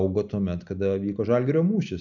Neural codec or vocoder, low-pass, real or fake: none; 7.2 kHz; real